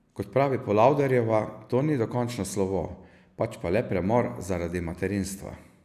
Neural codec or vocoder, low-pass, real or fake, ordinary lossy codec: none; 14.4 kHz; real; none